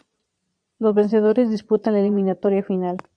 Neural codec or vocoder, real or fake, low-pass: vocoder, 22.05 kHz, 80 mel bands, Vocos; fake; 9.9 kHz